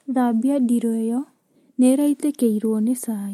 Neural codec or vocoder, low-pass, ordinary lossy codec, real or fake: none; 19.8 kHz; MP3, 64 kbps; real